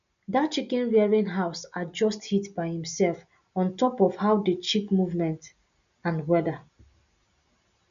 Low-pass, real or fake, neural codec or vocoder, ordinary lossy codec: 7.2 kHz; real; none; none